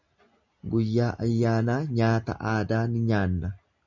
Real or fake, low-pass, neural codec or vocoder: real; 7.2 kHz; none